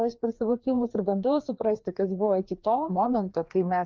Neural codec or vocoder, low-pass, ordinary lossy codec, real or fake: codec, 16 kHz, 2 kbps, FreqCodec, larger model; 7.2 kHz; Opus, 32 kbps; fake